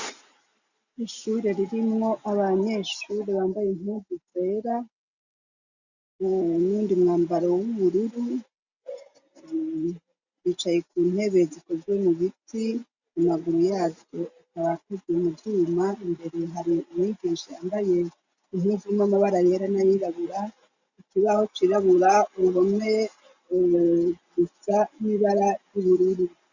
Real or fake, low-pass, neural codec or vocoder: real; 7.2 kHz; none